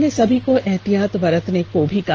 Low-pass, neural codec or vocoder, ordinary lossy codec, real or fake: 7.2 kHz; none; Opus, 16 kbps; real